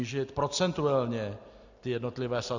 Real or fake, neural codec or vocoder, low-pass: real; none; 7.2 kHz